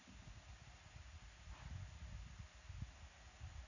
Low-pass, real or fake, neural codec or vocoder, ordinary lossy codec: 7.2 kHz; real; none; none